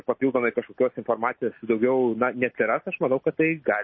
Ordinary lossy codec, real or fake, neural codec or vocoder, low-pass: MP3, 24 kbps; real; none; 7.2 kHz